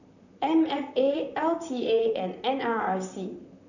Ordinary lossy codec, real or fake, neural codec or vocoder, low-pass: none; fake; vocoder, 44.1 kHz, 128 mel bands, Pupu-Vocoder; 7.2 kHz